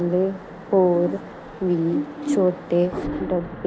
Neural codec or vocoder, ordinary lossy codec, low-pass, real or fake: none; none; none; real